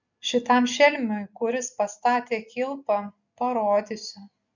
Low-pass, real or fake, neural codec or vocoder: 7.2 kHz; real; none